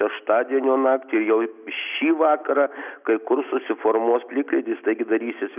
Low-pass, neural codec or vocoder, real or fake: 3.6 kHz; none; real